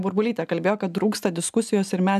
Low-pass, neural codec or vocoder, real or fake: 14.4 kHz; none; real